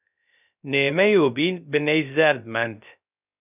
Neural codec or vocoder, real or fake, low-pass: codec, 16 kHz, 0.3 kbps, FocalCodec; fake; 3.6 kHz